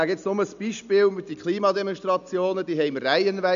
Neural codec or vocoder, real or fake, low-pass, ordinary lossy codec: none; real; 7.2 kHz; none